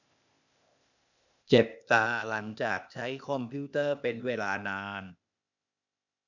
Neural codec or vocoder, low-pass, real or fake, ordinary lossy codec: codec, 16 kHz, 0.8 kbps, ZipCodec; 7.2 kHz; fake; none